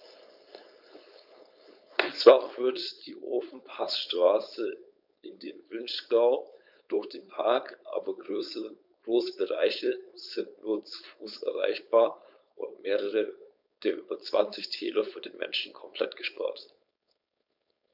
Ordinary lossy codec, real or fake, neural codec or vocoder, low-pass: none; fake; codec, 16 kHz, 4.8 kbps, FACodec; 5.4 kHz